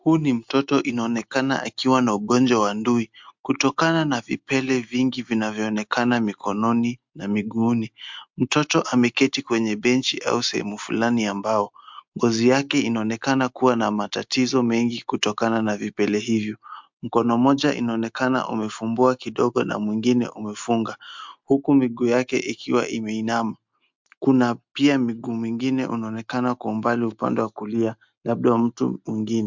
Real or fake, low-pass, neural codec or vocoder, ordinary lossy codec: real; 7.2 kHz; none; MP3, 64 kbps